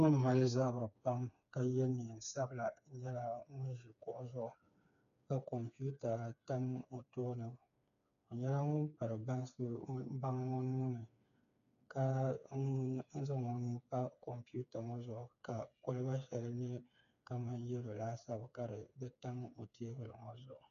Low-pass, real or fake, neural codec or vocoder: 7.2 kHz; fake; codec, 16 kHz, 4 kbps, FreqCodec, smaller model